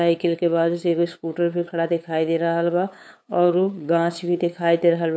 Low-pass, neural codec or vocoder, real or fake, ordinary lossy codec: none; codec, 16 kHz, 8 kbps, FunCodec, trained on LibriTTS, 25 frames a second; fake; none